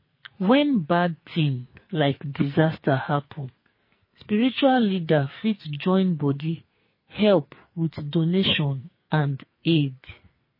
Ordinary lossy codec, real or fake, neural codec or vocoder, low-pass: MP3, 24 kbps; fake; codec, 44.1 kHz, 2.6 kbps, SNAC; 5.4 kHz